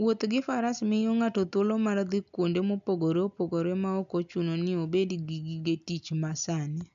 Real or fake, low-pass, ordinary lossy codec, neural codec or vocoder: real; 7.2 kHz; AAC, 64 kbps; none